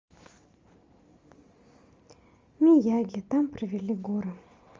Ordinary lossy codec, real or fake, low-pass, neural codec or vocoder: Opus, 32 kbps; real; 7.2 kHz; none